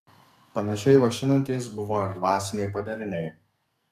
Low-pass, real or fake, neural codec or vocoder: 14.4 kHz; fake; codec, 44.1 kHz, 2.6 kbps, SNAC